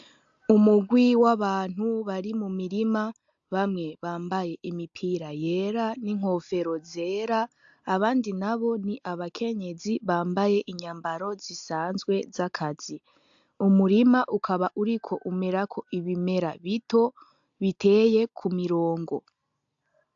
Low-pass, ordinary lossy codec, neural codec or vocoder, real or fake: 7.2 kHz; AAC, 64 kbps; none; real